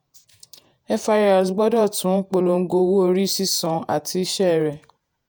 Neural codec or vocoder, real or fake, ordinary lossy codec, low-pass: vocoder, 48 kHz, 128 mel bands, Vocos; fake; none; none